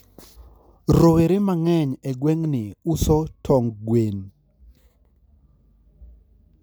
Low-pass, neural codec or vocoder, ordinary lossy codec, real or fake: none; none; none; real